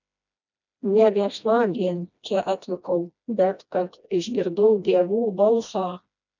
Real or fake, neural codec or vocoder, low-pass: fake; codec, 16 kHz, 1 kbps, FreqCodec, smaller model; 7.2 kHz